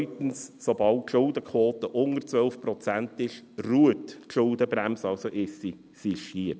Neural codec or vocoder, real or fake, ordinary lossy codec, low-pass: none; real; none; none